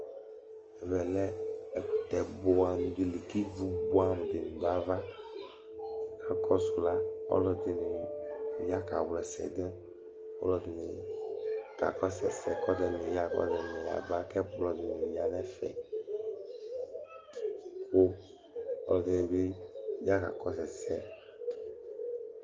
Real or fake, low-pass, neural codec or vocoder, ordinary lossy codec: real; 7.2 kHz; none; Opus, 24 kbps